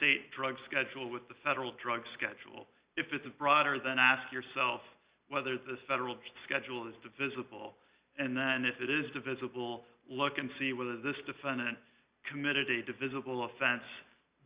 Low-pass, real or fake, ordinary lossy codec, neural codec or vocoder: 3.6 kHz; real; Opus, 64 kbps; none